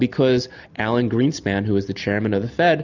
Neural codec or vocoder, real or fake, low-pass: none; real; 7.2 kHz